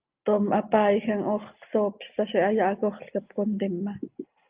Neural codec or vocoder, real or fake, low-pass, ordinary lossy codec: none; real; 3.6 kHz; Opus, 32 kbps